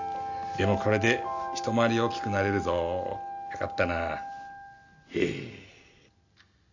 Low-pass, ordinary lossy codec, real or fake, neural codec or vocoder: 7.2 kHz; none; real; none